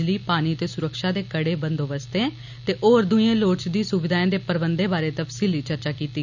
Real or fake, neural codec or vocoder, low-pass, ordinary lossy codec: real; none; 7.2 kHz; none